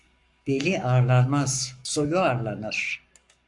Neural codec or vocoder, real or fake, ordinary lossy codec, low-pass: codec, 44.1 kHz, 7.8 kbps, Pupu-Codec; fake; MP3, 64 kbps; 10.8 kHz